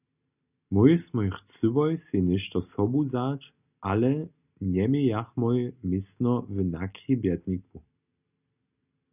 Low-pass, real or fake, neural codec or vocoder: 3.6 kHz; real; none